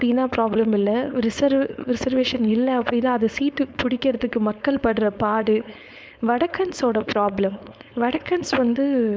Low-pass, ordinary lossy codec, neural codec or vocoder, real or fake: none; none; codec, 16 kHz, 4.8 kbps, FACodec; fake